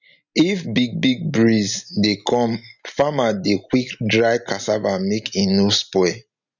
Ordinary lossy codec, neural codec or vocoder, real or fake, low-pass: none; none; real; 7.2 kHz